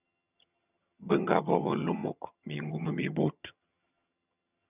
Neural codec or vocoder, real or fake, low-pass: vocoder, 22.05 kHz, 80 mel bands, HiFi-GAN; fake; 3.6 kHz